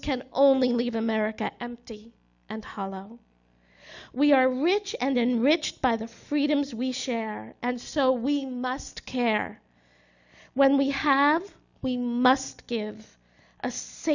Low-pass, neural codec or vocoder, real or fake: 7.2 kHz; none; real